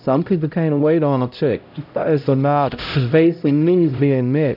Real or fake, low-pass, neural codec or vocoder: fake; 5.4 kHz; codec, 16 kHz, 0.5 kbps, X-Codec, HuBERT features, trained on LibriSpeech